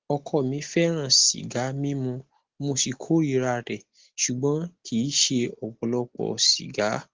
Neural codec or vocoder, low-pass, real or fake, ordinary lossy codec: none; 7.2 kHz; real; Opus, 16 kbps